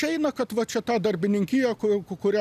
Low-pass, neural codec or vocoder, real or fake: 14.4 kHz; none; real